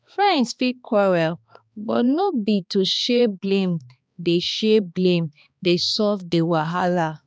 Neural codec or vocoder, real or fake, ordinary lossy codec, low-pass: codec, 16 kHz, 2 kbps, X-Codec, HuBERT features, trained on balanced general audio; fake; none; none